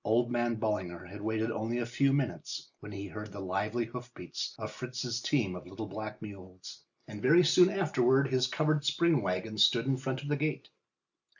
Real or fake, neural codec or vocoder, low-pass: real; none; 7.2 kHz